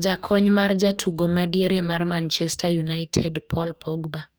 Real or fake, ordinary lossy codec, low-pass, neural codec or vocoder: fake; none; none; codec, 44.1 kHz, 2.6 kbps, DAC